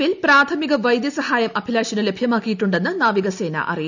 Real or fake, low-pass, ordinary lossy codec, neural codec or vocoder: real; 7.2 kHz; none; none